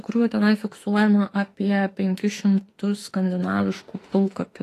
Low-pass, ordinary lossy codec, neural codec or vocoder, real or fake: 14.4 kHz; AAC, 48 kbps; autoencoder, 48 kHz, 32 numbers a frame, DAC-VAE, trained on Japanese speech; fake